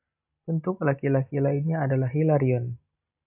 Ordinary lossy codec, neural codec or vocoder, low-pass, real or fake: AAC, 32 kbps; none; 3.6 kHz; real